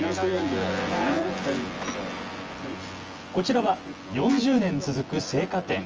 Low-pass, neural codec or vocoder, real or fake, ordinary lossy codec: 7.2 kHz; vocoder, 24 kHz, 100 mel bands, Vocos; fake; Opus, 24 kbps